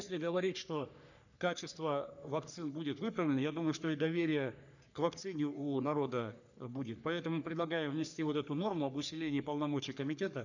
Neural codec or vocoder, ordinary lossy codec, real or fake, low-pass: codec, 44.1 kHz, 3.4 kbps, Pupu-Codec; none; fake; 7.2 kHz